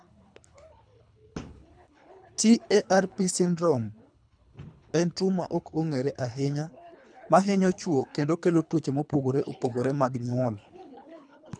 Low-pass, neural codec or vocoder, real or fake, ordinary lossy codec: 9.9 kHz; codec, 24 kHz, 3 kbps, HILCodec; fake; none